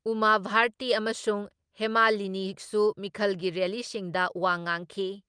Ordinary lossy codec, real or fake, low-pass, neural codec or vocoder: Opus, 32 kbps; real; 9.9 kHz; none